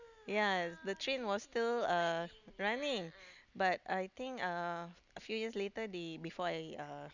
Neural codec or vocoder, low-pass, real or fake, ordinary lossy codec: none; 7.2 kHz; real; none